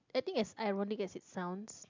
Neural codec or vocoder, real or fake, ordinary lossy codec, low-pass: none; real; MP3, 64 kbps; 7.2 kHz